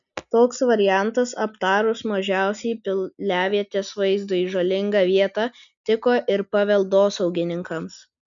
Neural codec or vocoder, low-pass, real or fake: none; 7.2 kHz; real